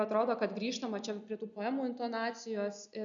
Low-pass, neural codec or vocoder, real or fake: 7.2 kHz; none; real